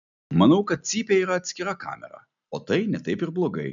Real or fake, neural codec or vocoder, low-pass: real; none; 7.2 kHz